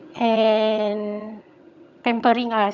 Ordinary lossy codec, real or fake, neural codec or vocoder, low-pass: none; fake; vocoder, 22.05 kHz, 80 mel bands, HiFi-GAN; 7.2 kHz